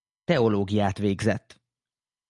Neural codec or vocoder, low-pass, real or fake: none; 10.8 kHz; real